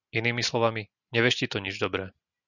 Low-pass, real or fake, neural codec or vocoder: 7.2 kHz; real; none